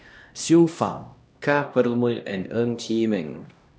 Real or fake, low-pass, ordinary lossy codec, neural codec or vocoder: fake; none; none; codec, 16 kHz, 1 kbps, X-Codec, HuBERT features, trained on LibriSpeech